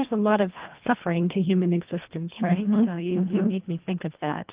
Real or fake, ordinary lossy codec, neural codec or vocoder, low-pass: fake; Opus, 24 kbps; codec, 24 kHz, 1.5 kbps, HILCodec; 3.6 kHz